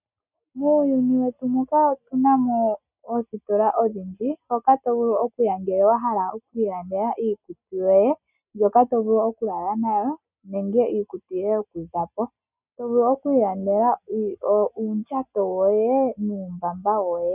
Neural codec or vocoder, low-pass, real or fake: none; 3.6 kHz; real